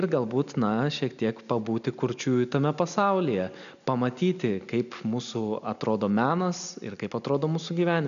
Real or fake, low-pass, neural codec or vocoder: real; 7.2 kHz; none